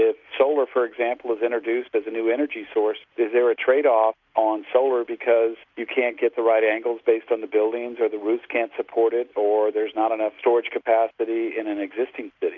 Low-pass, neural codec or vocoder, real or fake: 7.2 kHz; none; real